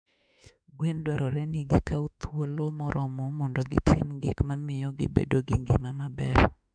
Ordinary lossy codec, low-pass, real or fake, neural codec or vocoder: MP3, 96 kbps; 9.9 kHz; fake; autoencoder, 48 kHz, 32 numbers a frame, DAC-VAE, trained on Japanese speech